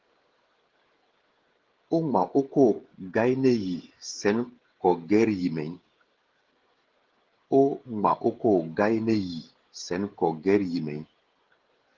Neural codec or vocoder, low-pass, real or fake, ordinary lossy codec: codec, 16 kHz, 16 kbps, FreqCodec, smaller model; 7.2 kHz; fake; Opus, 16 kbps